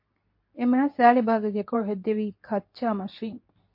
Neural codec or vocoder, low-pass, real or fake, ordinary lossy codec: codec, 24 kHz, 0.9 kbps, WavTokenizer, medium speech release version 2; 5.4 kHz; fake; MP3, 32 kbps